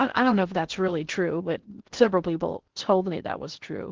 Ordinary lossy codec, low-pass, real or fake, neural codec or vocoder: Opus, 16 kbps; 7.2 kHz; fake; codec, 16 kHz in and 24 kHz out, 0.6 kbps, FocalCodec, streaming, 2048 codes